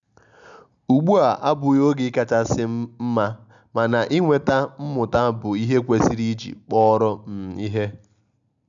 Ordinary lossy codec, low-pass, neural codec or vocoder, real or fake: none; 7.2 kHz; none; real